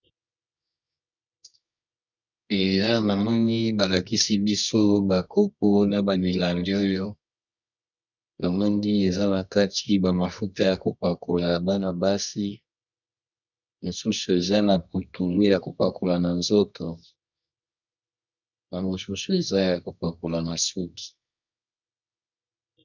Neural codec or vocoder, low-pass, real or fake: codec, 24 kHz, 0.9 kbps, WavTokenizer, medium music audio release; 7.2 kHz; fake